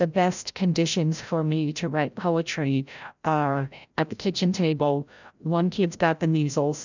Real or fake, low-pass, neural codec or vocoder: fake; 7.2 kHz; codec, 16 kHz, 0.5 kbps, FreqCodec, larger model